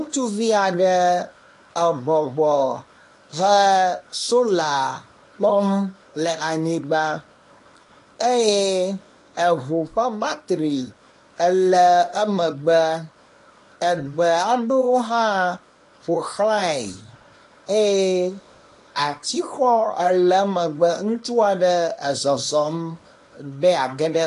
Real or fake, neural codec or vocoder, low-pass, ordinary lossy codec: fake; codec, 24 kHz, 0.9 kbps, WavTokenizer, small release; 10.8 kHz; AAC, 48 kbps